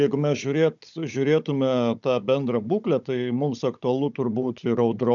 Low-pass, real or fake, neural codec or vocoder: 7.2 kHz; fake; codec, 16 kHz, 16 kbps, FunCodec, trained on Chinese and English, 50 frames a second